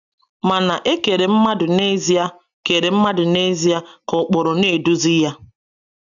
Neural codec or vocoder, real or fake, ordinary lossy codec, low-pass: none; real; none; 7.2 kHz